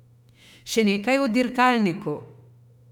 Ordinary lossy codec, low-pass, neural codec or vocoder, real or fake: none; 19.8 kHz; autoencoder, 48 kHz, 32 numbers a frame, DAC-VAE, trained on Japanese speech; fake